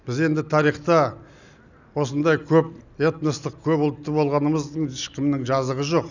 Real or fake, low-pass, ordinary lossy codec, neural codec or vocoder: real; 7.2 kHz; none; none